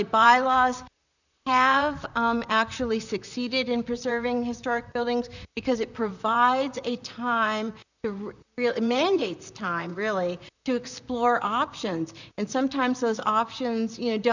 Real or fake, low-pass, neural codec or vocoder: fake; 7.2 kHz; vocoder, 44.1 kHz, 128 mel bands, Pupu-Vocoder